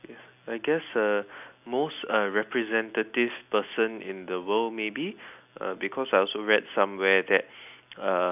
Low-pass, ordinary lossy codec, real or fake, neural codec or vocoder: 3.6 kHz; none; real; none